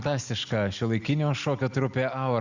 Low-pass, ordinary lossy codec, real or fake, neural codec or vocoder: 7.2 kHz; Opus, 64 kbps; real; none